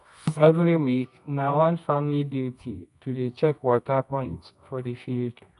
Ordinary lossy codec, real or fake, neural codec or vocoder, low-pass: none; fake; codec, 24 kHz, 0.9 kbps, WavTokenizer, medium music audio release; 10.8 kHz